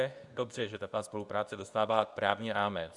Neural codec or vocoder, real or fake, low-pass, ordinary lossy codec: codec, 24 kHz, 0.9 kbps, WavTokenizer, medium speech release version 2; fake; 10.8 kHz; Opus, 64 kbps